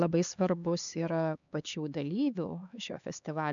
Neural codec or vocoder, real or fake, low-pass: codec, 16 kHz, 4 kbps, X-Codec, HuBERT features, trained on LibriSpeech; fake; 7.2 kHz